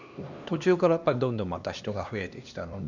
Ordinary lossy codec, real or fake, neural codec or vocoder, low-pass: none; fake; codec, 16 kHz, 2 kbps, X-Codec, HuBERT features, trained on LibriSpeech; 7.2 kHz